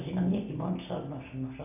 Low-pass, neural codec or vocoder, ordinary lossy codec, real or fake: 3.6 kHz; none; none; real